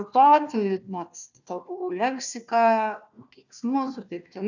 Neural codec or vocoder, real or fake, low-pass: codec, 16 kHz, 1 kbps, FunCodec, trained on Chinese and English, 50 frames a second; fake; 7.2 kHz